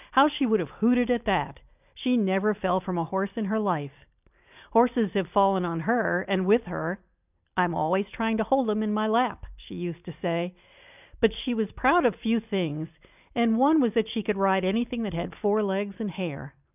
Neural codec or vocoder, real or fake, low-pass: none; real; 3.6 kHz